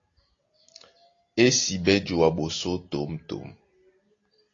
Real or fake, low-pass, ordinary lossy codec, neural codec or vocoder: real; 7.2 kHz; AAC, 32 kbps; none